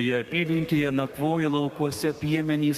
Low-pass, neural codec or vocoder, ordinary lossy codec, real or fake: 14.4 kHz; codec, 32 kHz, 1.9 kbps, SNAC; Opus, 64 kbps; fake